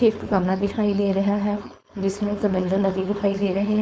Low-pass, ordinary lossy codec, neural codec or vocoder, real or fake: none; none; codec, 16 kHz, 4.8 kbps, FACodec; fake